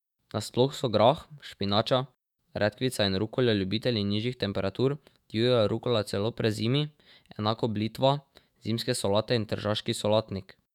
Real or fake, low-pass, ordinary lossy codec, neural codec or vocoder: fake; 19.8 kHz; none; autoencoder, 48 kHz, 128 numbers a frame, DAC-VAE, trained on Japanese speech